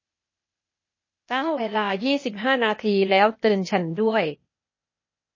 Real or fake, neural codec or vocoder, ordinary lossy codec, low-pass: fake; codec, 16 kHz, 0.8 kbps, ZipCodec; MP3, 32 kbps; 7.2 kHz